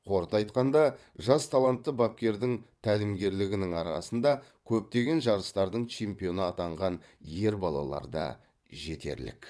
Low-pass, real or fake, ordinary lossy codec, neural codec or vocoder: none; fake; none; vocoder, 22.05 kHz, 80 mel bands, WaveNeXt